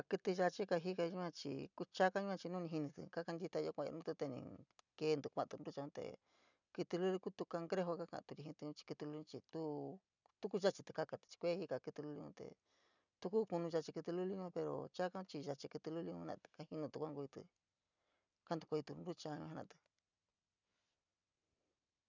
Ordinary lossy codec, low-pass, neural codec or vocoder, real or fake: none; 7.2 kHz; none; real